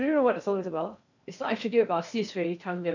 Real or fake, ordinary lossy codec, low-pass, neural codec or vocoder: fake; none; 7.2 kHz; codec, 16 kHz in and 24 kHz out, 0.8 kbps, FocalCodec, streaming, 65536 codes